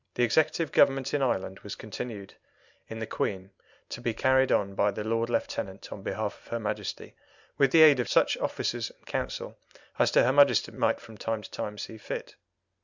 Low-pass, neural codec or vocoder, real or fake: 7.2 kHz; none; real